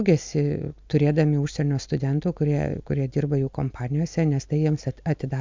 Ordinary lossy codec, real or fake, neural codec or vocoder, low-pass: MP3, 64 kbps; real; none; 7.2 kHz